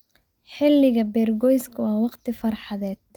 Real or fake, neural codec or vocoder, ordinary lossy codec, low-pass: real; none; Opus, 32 kbps; 19.8 kHz